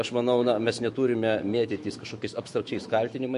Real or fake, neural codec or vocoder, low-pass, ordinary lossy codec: fake; autoencoder, 48 kHz, 128 numbers a frame, DAC-VAE, trained on Japanese speech; 14.4 kHz; MP3, 48 kbps